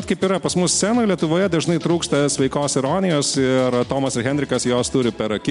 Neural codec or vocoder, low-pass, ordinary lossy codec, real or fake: none; 10.8 kHz; MP3, 64 kbps; real